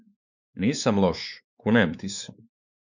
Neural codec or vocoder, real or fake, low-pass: codec, 16 kHz, 4 kbps, X-Codec, WavLM features, trained on Multilingual LibriSpeech; fake; 7.2 kHz